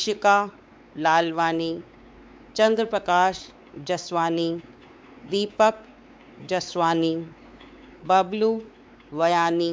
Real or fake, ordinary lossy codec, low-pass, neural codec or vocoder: fake; none; none; codec, 16 kHz, 4 kbps, X-Codec, WavLM features, trained on Multilingual LibriSpeech